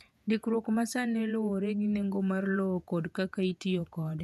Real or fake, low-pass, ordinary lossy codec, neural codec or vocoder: fake; 14.4 kHz; none; vocoder, 48 kHz, 128 mel bands, Vocos